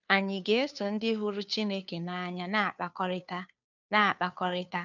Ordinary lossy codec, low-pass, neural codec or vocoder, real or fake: none; 7.2 kHz; codec, 16 kHz, 2 kbps, FunCodec, trained on Chinese and English, 25 frames a second; fake